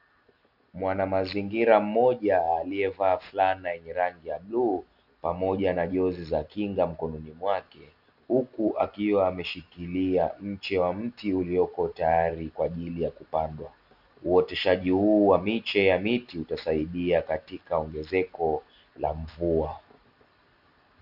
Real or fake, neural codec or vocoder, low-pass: real; none; 5.4 kHz